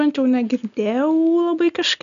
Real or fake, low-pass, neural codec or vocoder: real; 7.2 kHz; none